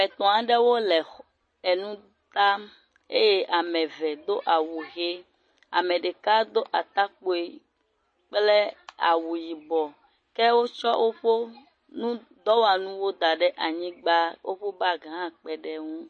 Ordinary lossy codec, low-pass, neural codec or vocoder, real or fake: MP3, 32 kbps; 9.9 kHz; none; real